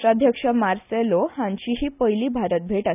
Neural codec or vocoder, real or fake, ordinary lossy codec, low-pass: none; real; none; 3.6 kHz